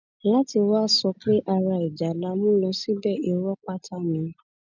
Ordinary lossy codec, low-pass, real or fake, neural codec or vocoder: none; 7.2 kHz; real; none